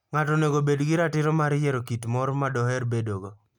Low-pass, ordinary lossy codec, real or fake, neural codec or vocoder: 19.8 kHz; none; real; none